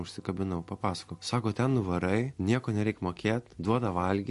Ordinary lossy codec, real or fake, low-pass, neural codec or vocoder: MP3, 48 kbps; real; 14.4 kHz; none